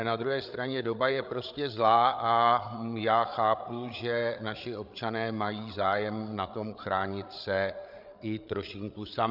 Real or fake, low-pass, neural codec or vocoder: fake; 5.4 kHz; codec, 16 kHz, 8 kbps, FreqCodec, larger model